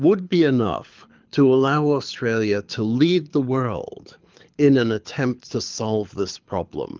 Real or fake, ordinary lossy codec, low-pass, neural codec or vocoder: fake; Opus, 32 kbps; 7.2 kHz; codec, 24 kHz, 6 kbps, HILCodec